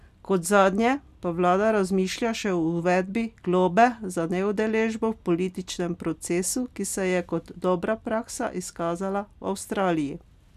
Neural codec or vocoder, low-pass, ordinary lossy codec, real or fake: none; 14.4 kHz; none; real